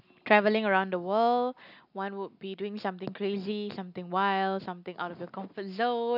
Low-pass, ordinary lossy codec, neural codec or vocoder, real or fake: 5.4 kHz; none; none; real